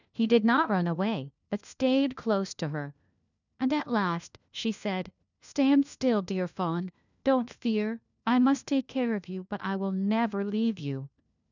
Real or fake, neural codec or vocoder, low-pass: fake; codec, 16 kHz, 1 kbps, FunCodec, trained on LibriTTS, 50 frames a second; 7.2 kHz